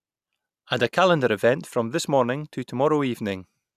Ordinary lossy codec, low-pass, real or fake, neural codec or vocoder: none; 14.4 kHz; real; none